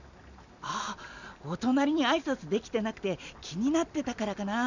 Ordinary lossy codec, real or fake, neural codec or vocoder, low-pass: none; real; none; 7.2 kHz